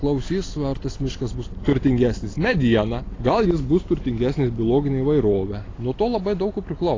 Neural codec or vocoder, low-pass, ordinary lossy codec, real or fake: none; 7.2 kHz; AAC, 32 kbps; real